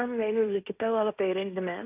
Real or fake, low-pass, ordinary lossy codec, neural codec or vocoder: fake; 3.6 kHz; none; codec, 16 kHz, 1.1 kbps, Voila-Tokenizer